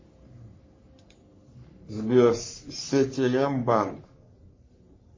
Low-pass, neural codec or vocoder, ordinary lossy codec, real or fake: 7.2 kHz; codec, 44.1 kHz, 3.4 kbps, Pupu-Codec; MP3, 32 kbps; fake